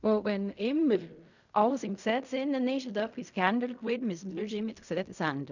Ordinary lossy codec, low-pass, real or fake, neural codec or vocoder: none; 7.2 kHz; fake; codec, 16 kHz in and 24 kHz out, 0.4 kbps, LongCat-Audio-Codec, fine tuned four codebook decoder